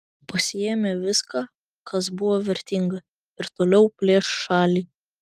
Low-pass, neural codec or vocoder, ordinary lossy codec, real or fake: 14.4 kHz; none; Opus, 32 kbps; real